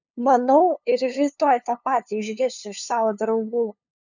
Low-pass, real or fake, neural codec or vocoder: 7.2 kHz; fake; codec, 16 kHz, 2 kbps, FunCodec, trained on LibriTTS, 25 frames a second